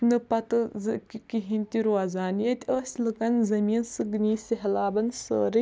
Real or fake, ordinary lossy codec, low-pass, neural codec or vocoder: real; none; none; none